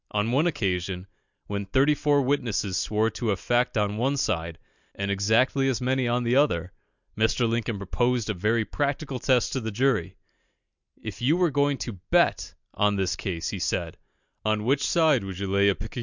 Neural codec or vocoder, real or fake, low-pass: none; real; 7.2 kHz